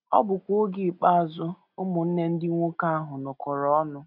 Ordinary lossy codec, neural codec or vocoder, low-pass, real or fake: none; none; 5.4 kHz; real